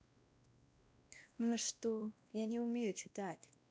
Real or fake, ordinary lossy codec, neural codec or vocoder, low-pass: fake; none; codec, 16 kHz, 1 kbps, X-Codec, WavLM features, trained on Multilingual LibriSpeech; none